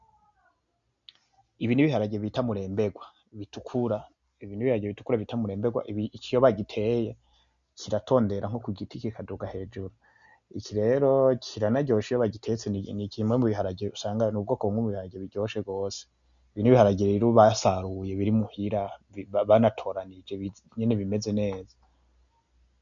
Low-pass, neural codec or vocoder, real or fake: 7.2 kHz; none; real